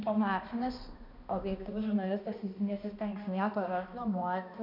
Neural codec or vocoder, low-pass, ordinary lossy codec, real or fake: codec, 16 kHz, 1 kbps, X-Codec, HuBERT features, trained on balanced general audio; 5.4 kHz; MP3, 48 kbps; fake